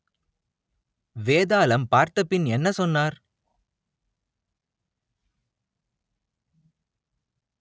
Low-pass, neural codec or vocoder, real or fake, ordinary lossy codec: none; none; real; none